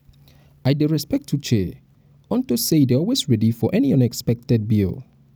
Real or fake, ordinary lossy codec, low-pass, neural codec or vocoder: real; none; none; none